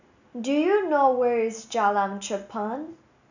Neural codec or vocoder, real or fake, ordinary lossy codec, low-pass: none; real; none; 7.2 kHz